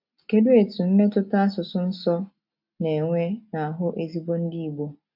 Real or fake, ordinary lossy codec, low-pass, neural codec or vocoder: real; AAC, 48 kbps; 5.4 kHz; none